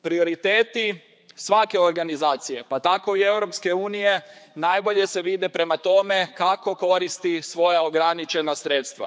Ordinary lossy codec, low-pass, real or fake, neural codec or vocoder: none; none; fake; codec, 16 kHz, 4 kbps, X-Codec, HuBERT features, trained on general audio